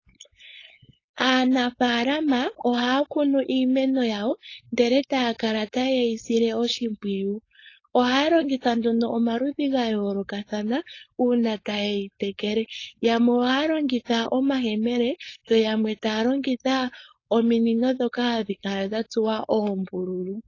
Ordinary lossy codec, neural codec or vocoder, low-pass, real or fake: AAC, 32 kbps; codec, 16 kHz, 4.8 kbps, FACodec; 7.2 kHz; fake